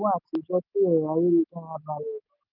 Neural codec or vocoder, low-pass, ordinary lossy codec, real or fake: none; 5.4 kHz; none; real